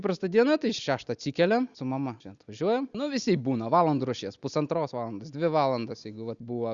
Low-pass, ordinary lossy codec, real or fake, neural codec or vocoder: 7.2 kHz; Opus, 64 kbps; real; none